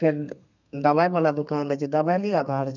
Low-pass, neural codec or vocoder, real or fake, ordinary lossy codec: 7.2 kHz; codec, 32 kHz, 1.9 kbps, SNAC; fake; none